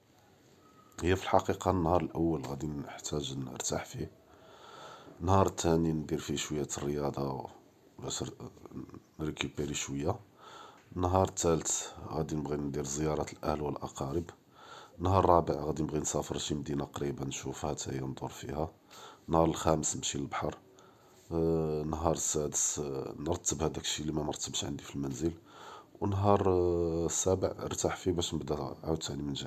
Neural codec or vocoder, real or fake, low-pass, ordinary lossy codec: none; real; 14.4 kHz; MP3, 96 kbps